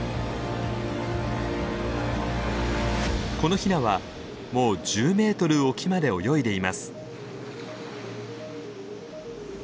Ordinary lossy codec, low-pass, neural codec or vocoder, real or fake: none; none; none; real